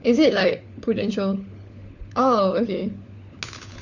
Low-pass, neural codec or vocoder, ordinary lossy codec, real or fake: 7.2 kHz; codec, 16 kHz, 4 kbps, FunCodec, trained on LibriTTS, 50 frames a second; none; fake